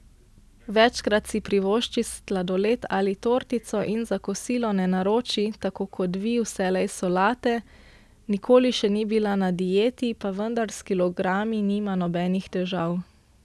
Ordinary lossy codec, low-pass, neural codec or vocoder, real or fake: none; none; none; real